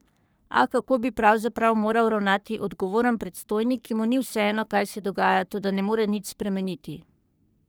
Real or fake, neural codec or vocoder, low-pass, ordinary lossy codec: fake; codec, 44.1 kHz, 3.4 kbps, Pupu-Codec; none; none